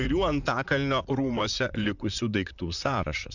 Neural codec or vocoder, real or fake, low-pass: vocoder, 44.1 kHz, 128 mel bands, Pupu-Vocoder; fake; 7.2 kHz